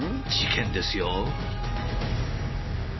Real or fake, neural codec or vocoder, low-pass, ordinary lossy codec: real; none; 7.2 kHz; MP3, 24 kbps